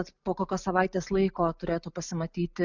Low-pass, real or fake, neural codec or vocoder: 7.2 kHz; real; none